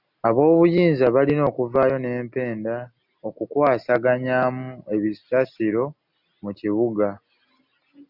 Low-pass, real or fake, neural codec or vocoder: 5.4 kHz; real; none